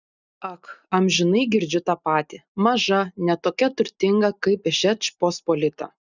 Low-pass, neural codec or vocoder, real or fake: 7.2 kHz; none; real